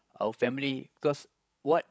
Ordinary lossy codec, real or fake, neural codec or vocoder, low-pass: none; fake; codec, 16 kHz, 16 kbps, FreqCodec, larger model; none